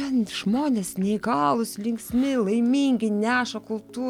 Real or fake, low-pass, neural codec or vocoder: real; 19.8 kHz; none